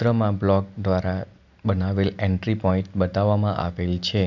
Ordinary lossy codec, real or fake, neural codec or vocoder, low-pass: none; real; none; 7.2 kHz